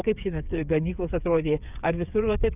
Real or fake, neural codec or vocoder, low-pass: fake; codec, 16 kHz, 8 kbps, FreqCodec, smaller model; 3.6 kHz